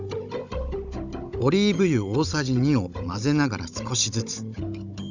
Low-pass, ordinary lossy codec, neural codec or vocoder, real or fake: 7.2 kHz; none; codec, 16 kHz, 16 kbps, FunCodec, trained on Chinese and English, 50 frames a second; fake